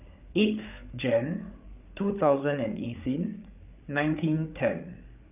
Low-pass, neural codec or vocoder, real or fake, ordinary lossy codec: 3.6 kHz; codec, 16 kHz, 8 kbps, FreqCodec, larger model; fake; none